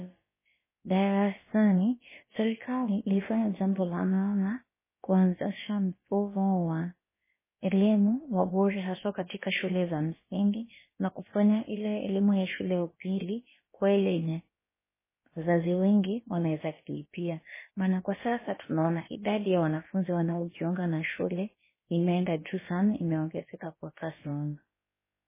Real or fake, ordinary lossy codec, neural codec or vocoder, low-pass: fake; MP3, 16 kbps; codec, 16 kHz, about 1 kbps, DyCAST, with the encoder's durations; 3.6 kHz